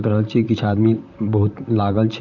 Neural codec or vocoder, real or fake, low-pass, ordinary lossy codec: vocoder, 44.1 kHz, 128 mel bands, Pupu-Vocoder; fake; 7.2 kHz; none